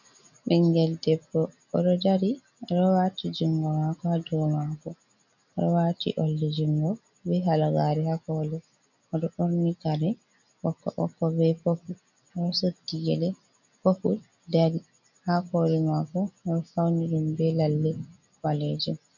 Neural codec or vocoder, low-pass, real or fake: none; 7.2 kHz; real